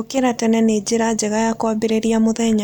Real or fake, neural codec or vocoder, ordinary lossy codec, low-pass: real; none; none; 19.8 kHz